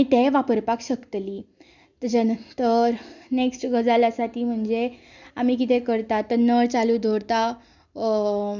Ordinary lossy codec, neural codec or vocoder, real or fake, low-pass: none; none; real; 7.2 kHz